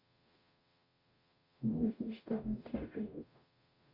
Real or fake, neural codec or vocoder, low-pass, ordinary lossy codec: fake; codec, 44.1 kHz, 0.9 kbps, DAC; 5.4 kHz; Opus, 64 kbps